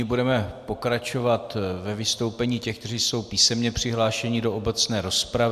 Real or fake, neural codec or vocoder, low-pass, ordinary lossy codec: real; none; 14.4 kHz; AAC, 96 kbps